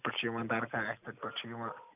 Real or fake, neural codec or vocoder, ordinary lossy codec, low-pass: real; none; none; 3.6 kHz